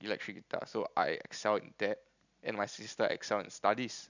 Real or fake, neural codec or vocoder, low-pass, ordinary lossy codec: real; none; 7.2 kHz; none